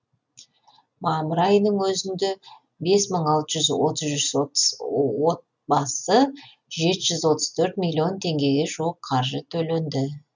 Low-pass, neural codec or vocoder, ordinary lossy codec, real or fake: 7.2 kHz; none; none; real